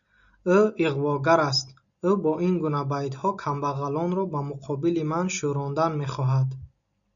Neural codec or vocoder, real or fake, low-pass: none; real; 7.2 kHz